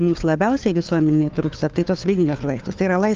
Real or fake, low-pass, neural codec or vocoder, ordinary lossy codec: fake; 7.2 kHz; codec, 16 kHz, 4 kbps, FunCodec, trained on LibriTTS, 50 frames a second; Opus, 32 kbps